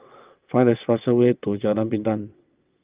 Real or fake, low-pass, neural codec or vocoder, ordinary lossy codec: fake; 3.6 kHz; vocoder, 22.05 kHz, 80 mel bands, WaveNeXt; Opus, 32 kbps